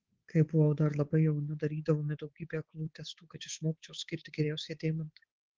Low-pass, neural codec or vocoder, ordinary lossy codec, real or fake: 7.2 kHz; codec, 24 kHz, 3.1 kbps, DualCodec; Opus, 16 kbps; fake